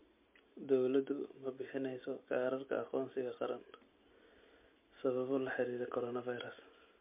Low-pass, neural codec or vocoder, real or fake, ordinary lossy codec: 3.6 kHz; none; real; MP3, 24 kbps